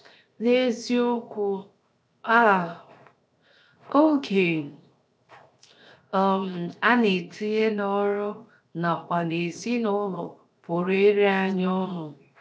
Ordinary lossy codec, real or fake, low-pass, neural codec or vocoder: none; fake; none; codec, 16 kHz, 0.7 kbps, FocalCodec